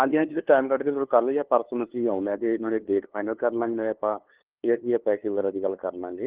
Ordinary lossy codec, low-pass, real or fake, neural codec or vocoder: Opus, 16 kbps; 3.6 kHz; fake; codec, 16 kHz, 2 kbps, FunCodec, trained on LibriTTS, 25 frames a second